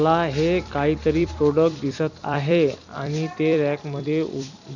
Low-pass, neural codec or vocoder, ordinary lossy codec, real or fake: 7.2 kHz; vocoder, 44.1 kHz, 128 mel bands every 256 samples, BigVGAN v2; none; fake